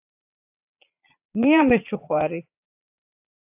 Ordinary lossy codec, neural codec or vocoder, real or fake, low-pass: AAC, 32 kbps; vocoder, 22.05 kHz, 80 mel bands, Vocos; fake; 3.6 kHz